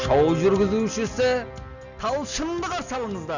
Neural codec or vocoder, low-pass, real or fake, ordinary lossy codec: none; 7.2 kHz; real; none